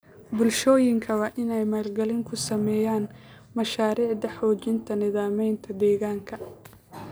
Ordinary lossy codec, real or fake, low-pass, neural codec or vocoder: none; real; none; none